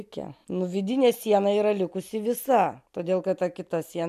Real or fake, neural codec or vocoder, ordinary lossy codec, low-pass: real; none; AAC, 96 kbps; 14.4 kHz